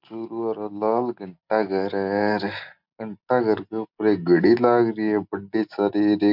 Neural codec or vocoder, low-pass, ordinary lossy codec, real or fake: none; 5.4 kHz; MP3, 48 kbps; real